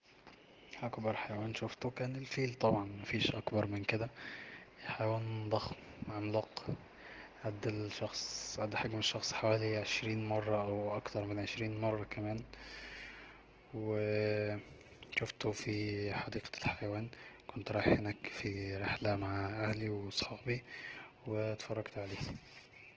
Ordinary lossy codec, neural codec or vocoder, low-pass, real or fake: Opus, 16 kbps; none; 7.2 kHz; real